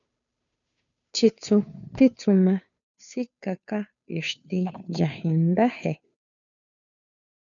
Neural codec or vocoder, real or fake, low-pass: codec, 16 kHz, 2 kbps, FunCodec, trained on Chinese and English, 25 frames a second; fake; 7.2 kHz